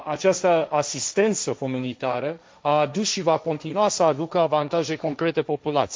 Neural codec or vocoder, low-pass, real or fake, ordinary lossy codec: codec, 16 kHz, 1.1 kbps, Voila-Tokenizer; none; fake; none